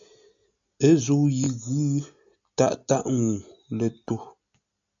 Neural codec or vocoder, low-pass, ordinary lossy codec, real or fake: none; 7.2 kHz; AAC, 64 kbps; real